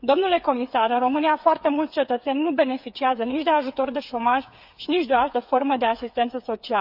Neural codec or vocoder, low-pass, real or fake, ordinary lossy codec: codec, 16 kHz, 8 kbps, FreqCodec, smaller model; 5.4 kHz; fake; none